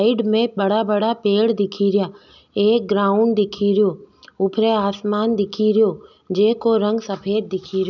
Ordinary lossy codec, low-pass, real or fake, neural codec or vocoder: none; 7.2 kHz; real; none